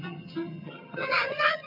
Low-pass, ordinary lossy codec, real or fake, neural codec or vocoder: 5.4 kHz; none; fake; vocoder, 22.05 kHz, 80 mel bands, HiFi-GAN